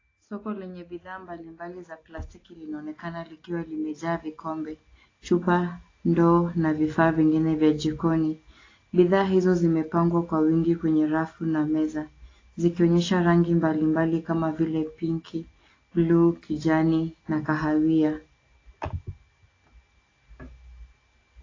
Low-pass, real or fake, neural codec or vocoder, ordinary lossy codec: 7.2 kHz; real; none; AAC, 32 kbps